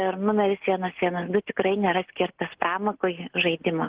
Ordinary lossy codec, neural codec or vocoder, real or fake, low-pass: Opus, 16 kbps; none; real; 3.6 kHz